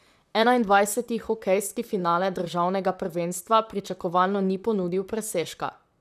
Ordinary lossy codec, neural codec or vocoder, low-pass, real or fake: none; vocoder, 44.1 kHz, 128 mel bands, Pupu-Vocoder; 14.4 kHz; fake